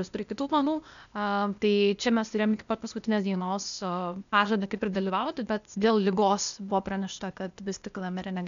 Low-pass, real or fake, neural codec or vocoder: 7.2 kHz; fake; codec, 16 kHz, 0.8 kbps, ZipCodec